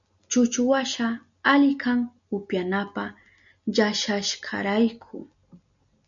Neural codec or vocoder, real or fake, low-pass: none; real; 7.2 kHz